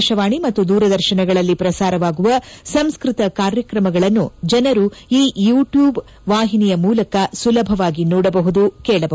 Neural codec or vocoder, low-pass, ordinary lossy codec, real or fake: none; none; none; real